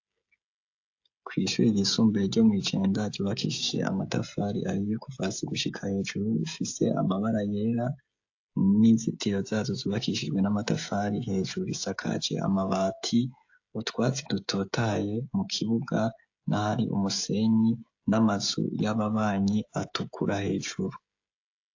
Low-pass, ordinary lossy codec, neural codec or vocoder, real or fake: 7.2 kHz; AAC, 48 kbps; codec, 16 kHz, 16 kbps, FreqCodec, smaller model; fake